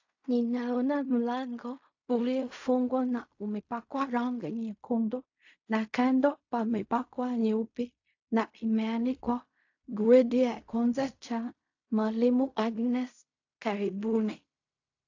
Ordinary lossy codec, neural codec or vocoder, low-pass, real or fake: AAC, 48 kbps; codec, 16 kHz in and 24 kHz out, 0.4 kbps, LongCat-Audio-Codec, fine tuned four codebook decoder; 7.2 kHz; fake